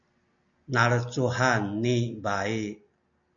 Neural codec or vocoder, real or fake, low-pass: none; real; 7.2 kHz